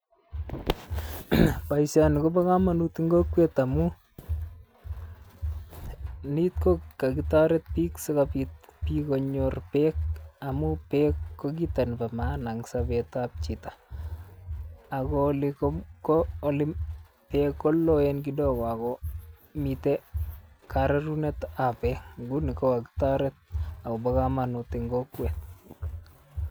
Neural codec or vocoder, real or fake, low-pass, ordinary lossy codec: none; real; none; none